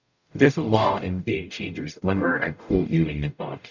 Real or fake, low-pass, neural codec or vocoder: fake; 7.2 kHz; codec, 44.1 kHz, 0.9 kbps, DAC